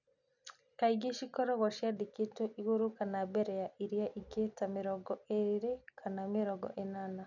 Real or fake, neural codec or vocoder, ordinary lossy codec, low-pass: real; none; none; 7.2 kHz